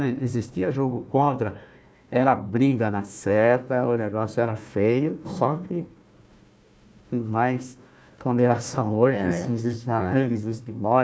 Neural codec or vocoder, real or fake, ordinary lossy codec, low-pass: codec, 16 kHz, 1 kbps, FunCodec, trained on Chinese and English, 50 frames a second; fake; none; none